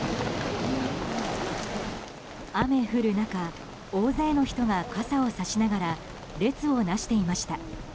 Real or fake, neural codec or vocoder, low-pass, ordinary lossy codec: real; none; none; none